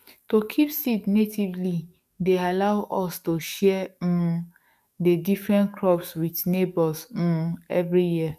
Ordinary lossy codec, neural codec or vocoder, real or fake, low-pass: none; codec, 44.1 kHz, 7.8 kbps, DAC; fake; 14.4 kHz